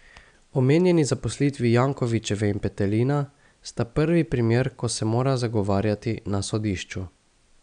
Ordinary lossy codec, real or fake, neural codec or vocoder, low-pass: none; real; none; 9.9 kHz